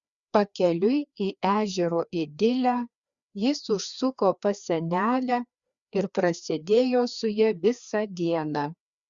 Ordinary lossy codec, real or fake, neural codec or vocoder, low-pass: Opus, 64 kbps; fake; codec, 16 kHz, 2 kbps, FreqCodec, larger model; 7.2 kHz